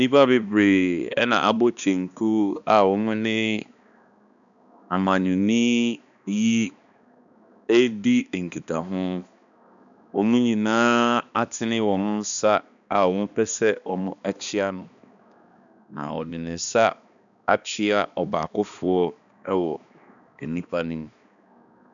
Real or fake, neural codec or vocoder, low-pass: fake; codec, 16 kHz, 2 kbps, X-Codec, HuBERT features, trained on balanced general audio; 7.2 kHz